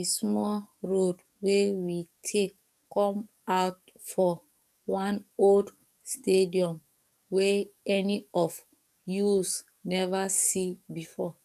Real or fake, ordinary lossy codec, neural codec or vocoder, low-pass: fake; none; codec, 44.1 kHz, 7.8 kbps, DAC; 14.4 kHz